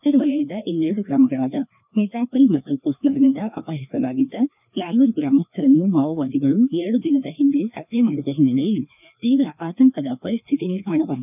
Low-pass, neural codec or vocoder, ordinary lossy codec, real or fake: 3.6 kHz; codec, 16 kHz, 2 kbps, FreqCodec, larger model; none; fake